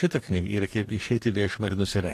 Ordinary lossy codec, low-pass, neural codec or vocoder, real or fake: AAC, 48 kbps; 14.4 kHz; codec, 44.1 kHz, 2.6 kbps, DAC; fake